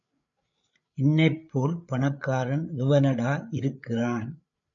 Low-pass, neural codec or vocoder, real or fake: 7.2 kHz; codec, 16 kHz, 8 kbps, FreqCodec, larger model; fake